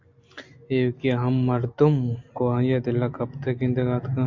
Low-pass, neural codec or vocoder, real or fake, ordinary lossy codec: 7.2 kHz; none; real; MP3, 48 kbps